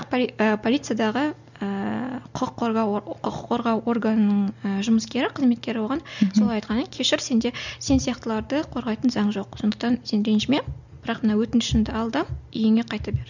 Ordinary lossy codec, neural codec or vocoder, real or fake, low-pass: none; none; real; 7.2 kHz